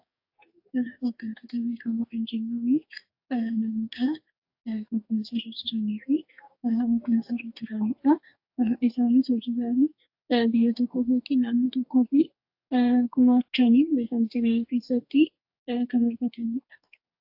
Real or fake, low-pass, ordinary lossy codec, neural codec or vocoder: fake; 5.4 kHz; AAC, 48 kbps; codec, 44.1 kHz, 2.6 kbps, DAC